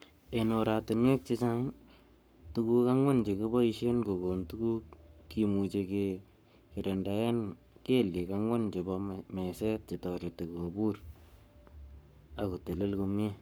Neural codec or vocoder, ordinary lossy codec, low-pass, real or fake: codec, 44.1 kHz, 7.8 kbps, Pupu-Codec; none; none; fake